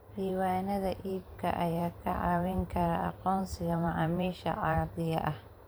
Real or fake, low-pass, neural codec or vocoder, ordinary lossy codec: fake; none; vocoder, 44.1 kHz, 128 mel bands every 512 samples, BigVGAN v2; none